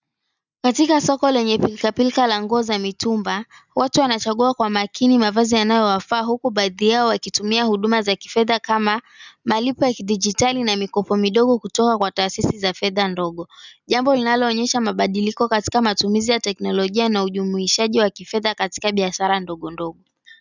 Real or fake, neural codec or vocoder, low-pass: real; none; 7.2 kHz